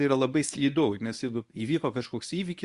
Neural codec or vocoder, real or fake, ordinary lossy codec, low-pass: codec, 24 kHz, 0.9 kbps, WavTokenizer, medium speech release version 1; fake; Opus, 64 kbps; 10.8 kHz